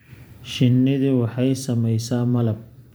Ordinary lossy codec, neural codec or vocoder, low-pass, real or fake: none; vocoder, 44.1 kHz, 128 mel bands every 512 samples, BigVGAN v2; none; fake